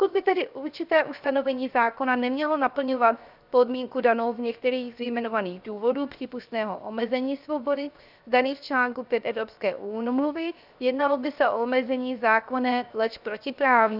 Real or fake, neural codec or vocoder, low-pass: fake; codec, 16 kHz, 0.7 kbps, FocalCodec; 5.4 kHz